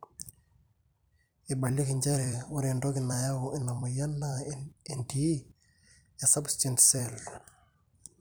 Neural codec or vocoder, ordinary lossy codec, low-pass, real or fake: vocoder, 44.1 kHz, 128 mel bands every 256 samples, BigVGAN v2; none; none; fake